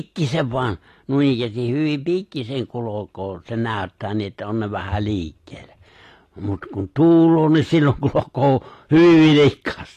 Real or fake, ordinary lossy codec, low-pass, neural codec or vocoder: real; AAC, 48 kbps; 14.4 kHz; none